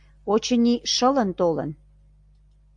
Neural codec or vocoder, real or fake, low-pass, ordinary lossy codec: none; real; 9.9 kHz; AAC, 64 kbps